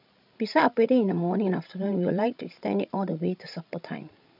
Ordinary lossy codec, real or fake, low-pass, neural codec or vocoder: none; fake; 5.4 kHz; codec, 16 kHz, 16 kbps, FreqCodec, larger model